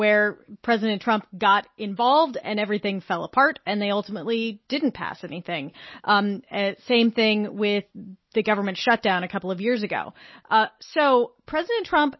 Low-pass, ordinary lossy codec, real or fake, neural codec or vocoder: 7.2 kHz; MP3, 24 kbps; real; none